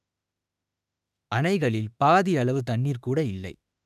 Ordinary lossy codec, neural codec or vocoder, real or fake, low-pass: none; autoencoder, 48 kHz, 32 numbers a frame, DAC-VAE, trained on Japanese speech; fake; 14.4 kHz